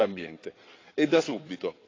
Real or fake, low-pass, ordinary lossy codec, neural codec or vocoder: fake; 7.2 kHz; AAC, 32 kbps; codec, 16 kHz in and 24 kHz out, 2.2 kbps, FireRedTTS-2 codec